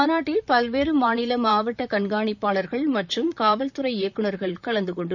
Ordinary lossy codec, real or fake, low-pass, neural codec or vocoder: none; fake; 7.2 kHz; vocoder, 44.1 kHz, 128 mel bands, Pupu-Vocoder